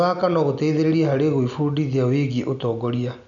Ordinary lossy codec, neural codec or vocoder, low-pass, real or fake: none; none; 7.2 kHz; real